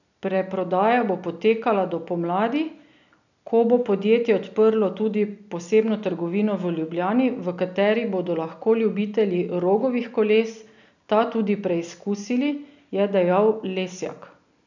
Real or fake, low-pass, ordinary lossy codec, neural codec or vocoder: real; 7.2 kHz; none; none